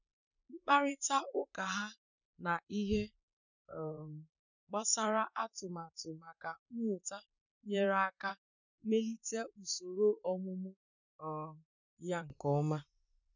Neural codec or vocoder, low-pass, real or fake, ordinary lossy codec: vocoder, 44.1 kHz, 128 mel bands, Pupu-Vocoder; 7.2 kHz; fake; none